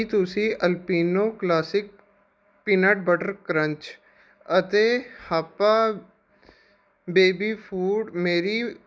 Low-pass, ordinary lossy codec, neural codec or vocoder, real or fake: none; none; none; real